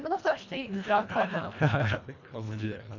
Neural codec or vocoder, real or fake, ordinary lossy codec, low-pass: codec, 24 kHz, 1.5 kbps, HILCodec; fake; none; 7.2 kHz